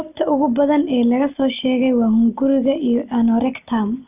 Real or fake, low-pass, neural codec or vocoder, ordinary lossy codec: real; 3.6 kHz; none; none